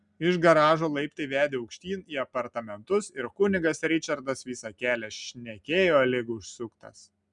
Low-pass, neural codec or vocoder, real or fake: 10.8 kHz; none; real